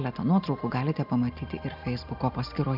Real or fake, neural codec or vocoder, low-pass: real; none; 5.4 kHz